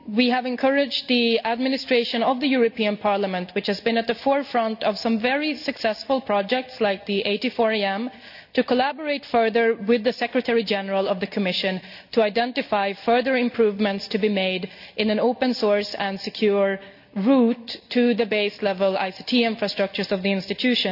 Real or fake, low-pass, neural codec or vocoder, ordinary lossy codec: real; 5.4 kHz; none; none